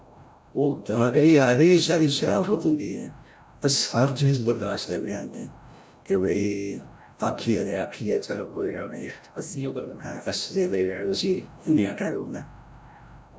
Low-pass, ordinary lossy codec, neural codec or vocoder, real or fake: none; none; codec, 16 kHz, 0.5 kbps, FreqCodec, larger model; fake